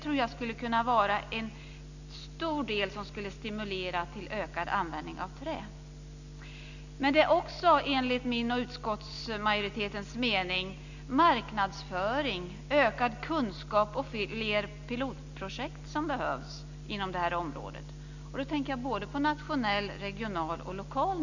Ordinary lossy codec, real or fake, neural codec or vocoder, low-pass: none; real; none; 7.2 kHz